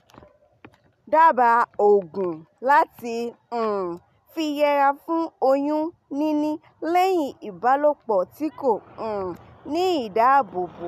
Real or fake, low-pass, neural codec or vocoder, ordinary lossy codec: real; 14.4 kHz; none; none